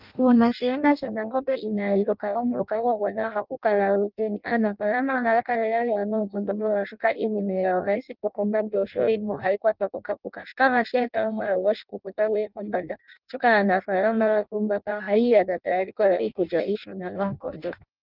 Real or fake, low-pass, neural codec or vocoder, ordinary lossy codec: fake; 5.4 kHz; codec, 16 kHz in and 24 kHz out, 0.6 kbps, FireRedTTS-2 codec; Opus, 32 kbps